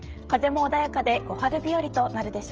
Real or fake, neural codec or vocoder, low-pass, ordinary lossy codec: fake; codec, 16 kHz, 16 kbps, FreqCodec, smaller model; 7.2 kHz; Opus, 24 kbps